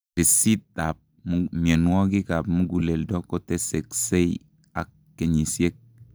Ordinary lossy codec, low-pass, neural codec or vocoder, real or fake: none; none; none; real